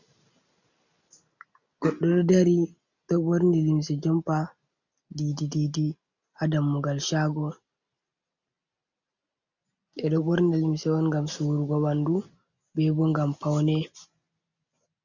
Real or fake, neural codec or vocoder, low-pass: real; none; 7.2 kHz